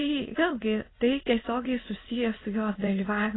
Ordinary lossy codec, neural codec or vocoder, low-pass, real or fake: AAC, 16 kbps; autoencoder, 22.05 kHz, a latent of 192 numbers a frame, VITS, trained on many speakers; 7.2 kHz; fake